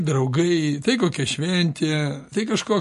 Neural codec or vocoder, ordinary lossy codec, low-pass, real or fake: none; MP3, 48 kbps; 14.4 kHz; real